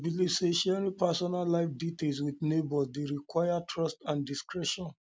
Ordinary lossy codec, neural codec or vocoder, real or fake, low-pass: none; none; real; none